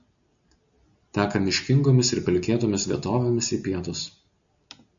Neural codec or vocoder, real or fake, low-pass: none; real; 7.2 kHz